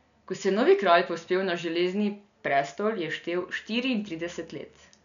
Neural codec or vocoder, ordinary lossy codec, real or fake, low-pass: none; none; real; 7.2 kHz